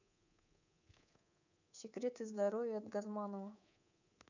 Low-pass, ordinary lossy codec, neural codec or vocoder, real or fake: 7.2 kHz; none; codec, 24 kHz, 3.1 kbps, DualCodec; fake